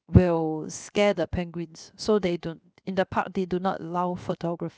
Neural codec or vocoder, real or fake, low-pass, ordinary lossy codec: codec, 16 kHz, 0.7 kbps, FocalCodec; fake; none; none